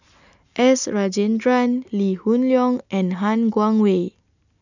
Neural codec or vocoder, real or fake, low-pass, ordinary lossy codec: none; real; 7.2 kHz; none